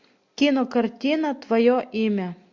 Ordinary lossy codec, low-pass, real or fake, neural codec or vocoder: MP3, 48 kbps; 7.2 kHz; real; none